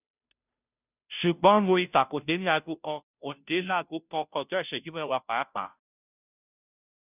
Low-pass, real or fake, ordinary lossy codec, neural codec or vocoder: 3.6 kHz; fake; none; codec, 16 kHz, 0.5 kbps, FunCodec, trained on Chinese and English, 25 frames a second